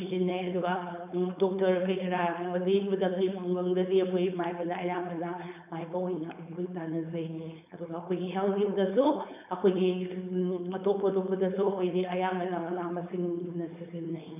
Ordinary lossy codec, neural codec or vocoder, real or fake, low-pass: none; codec, 16 kHz, 4.8 kbps, FACodec; fake; 3.6 kHz